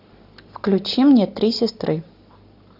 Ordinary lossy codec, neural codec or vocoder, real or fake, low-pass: AAC, 48 kbps; none; real; 5.4 kHz